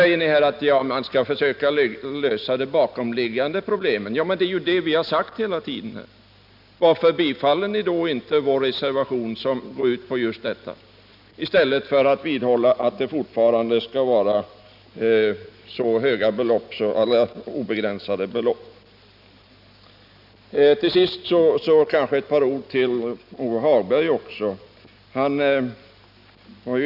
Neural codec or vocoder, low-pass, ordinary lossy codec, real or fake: none; 5.4 kHz; none; real